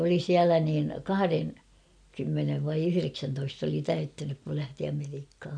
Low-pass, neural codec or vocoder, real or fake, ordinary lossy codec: 9.9 kHz; none; real; none